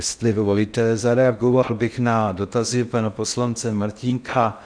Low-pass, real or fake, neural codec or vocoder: 9.9 kHz; fake; codec, 16 kHz in and 24 kHz out, 0.6 kbps, FocalCodec, streaming, 4096 codes